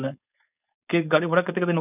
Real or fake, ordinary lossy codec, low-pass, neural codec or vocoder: fake; none; 3.6 kHz; codec, 16 kHz, 4.8 kbps, FACodec